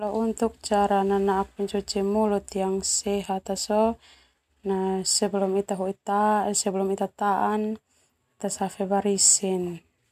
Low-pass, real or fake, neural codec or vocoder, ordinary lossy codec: 14.4 kHz; real; none; MP3, 96 kbps